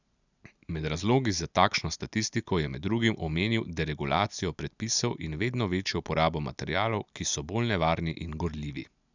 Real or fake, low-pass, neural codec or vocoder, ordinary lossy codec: real; 7.2 kHz; none; none